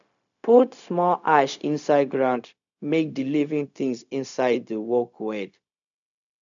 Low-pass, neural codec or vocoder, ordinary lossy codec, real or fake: 7.2 kHz; codec, 16 kHz, 0.4 kbps, LongCat-Audio-Codec; none; fake